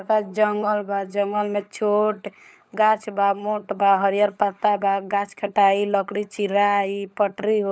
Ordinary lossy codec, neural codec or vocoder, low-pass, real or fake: none; codec, 16 kHz, 16 kbps, FunCodec, trained on LibriTTS, 50 frames a second; none; fake